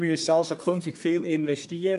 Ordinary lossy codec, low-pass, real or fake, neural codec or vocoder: none; 10.8 kHz; fake; codec, 24 kHz, 1 kbps, SNAC